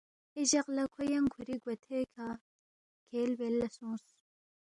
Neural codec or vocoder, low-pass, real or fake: none; 10.8 kHz; real